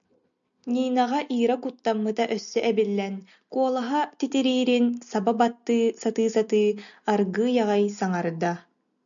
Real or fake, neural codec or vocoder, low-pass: real; none; 7.2 kHz